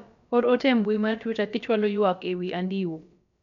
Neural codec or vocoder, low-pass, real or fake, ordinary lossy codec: codec, 16 kHz, about 1 kbps, DyCAST, with the encoder's durations; 7.2 kHz; fake; none